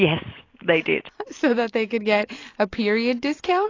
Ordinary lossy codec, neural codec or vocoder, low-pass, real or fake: AAC, 32 kbps; none; 7.2 kHz; real